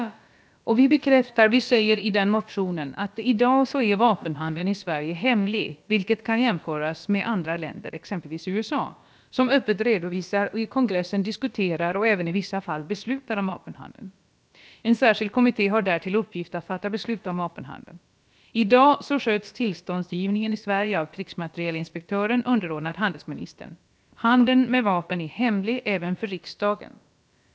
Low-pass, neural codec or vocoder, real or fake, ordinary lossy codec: none; codec, 16 kHz, about 1 kbps, DyCAST, with the encoder's durations; fake; none